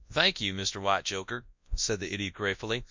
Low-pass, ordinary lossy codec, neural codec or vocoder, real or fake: 7.2 kHz; MP3, 64 kbps; codec, 24 kHz, 0.9 kbps, WavTokenizer, large speech release; fake